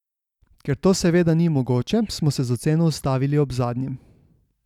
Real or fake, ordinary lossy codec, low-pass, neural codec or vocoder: real; none; 19.8 kHz; none